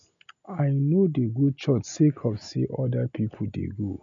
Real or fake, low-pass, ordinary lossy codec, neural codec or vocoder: real; 7.2 kHz; MP3, 64 kbps; none